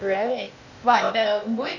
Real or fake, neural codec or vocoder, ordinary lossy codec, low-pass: fake; codec, 16 kHz, 0.8 kbps, ZipCodec; none; 7.2 kHz